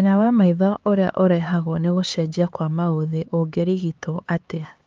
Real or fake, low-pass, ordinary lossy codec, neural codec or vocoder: fake; 7.2 kHz; Opus, 32 kbps; codec, 16 kHz, 4 kbps, X-Codec, HuBERT features, trained on LibriSpeech